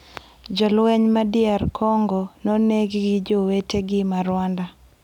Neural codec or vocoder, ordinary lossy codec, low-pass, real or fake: none; none; 19.8 kHz; real